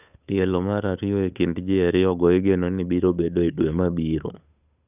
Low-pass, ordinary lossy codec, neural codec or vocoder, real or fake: 3.6 kHz; none; codec, 16 kHz, 8 kbps, FunCodec, trained on Chinese and English, 25 frames a second; fake